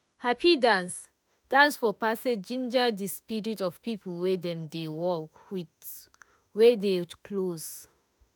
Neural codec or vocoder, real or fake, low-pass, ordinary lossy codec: autoencoder, 48 kHz, 32 numbers a frame, DAC-VAE, trained on Japanese speech; fake; none; none